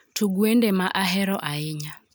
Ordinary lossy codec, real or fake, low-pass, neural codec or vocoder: none; real; none; none